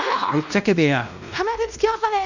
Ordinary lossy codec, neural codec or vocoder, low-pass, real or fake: none; codec, 16 kHz, 1 kbps, X-Codec, WavLM features, trained on Multilingual LibriSpeech; 7.2 kHz; fake